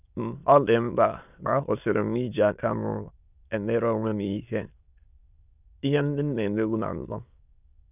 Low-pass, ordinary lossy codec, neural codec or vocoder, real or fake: 3.6 kHz; none; autoencoder, 22.05 kHz, a latent of 192 numbers a frame, VITS, trained on many speakers; fake